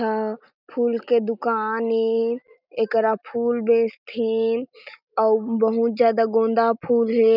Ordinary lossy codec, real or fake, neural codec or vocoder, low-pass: none; real; none; 5.4 kHz